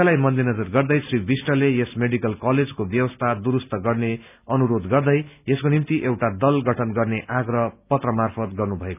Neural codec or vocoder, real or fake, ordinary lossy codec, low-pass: none; real; none; 3.6 kHz